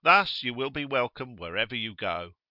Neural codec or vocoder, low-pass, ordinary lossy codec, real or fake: none; 5.4 kHz; Opus, 64 kbps; real